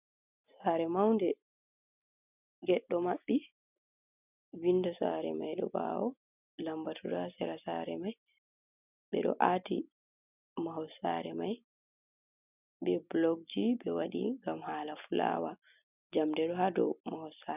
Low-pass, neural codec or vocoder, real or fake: 3.6 kHz; none; real